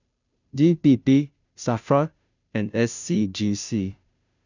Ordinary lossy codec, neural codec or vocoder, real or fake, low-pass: none; codec, 16 kHz, 0.5 kbps, FunCodec, trained on Chinese and English, 25 frames a second; fake; 7.2 kHz